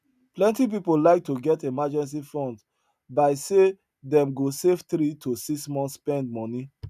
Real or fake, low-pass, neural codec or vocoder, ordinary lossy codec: real; 14.4 kHz; none; none